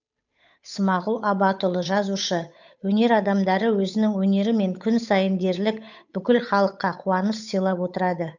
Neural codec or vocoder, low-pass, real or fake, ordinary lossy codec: codec, 16 kHz, 8 kbps, FunCodec, trained on Chinese and English, 25 frames a second; 7.2 kHz; fake; none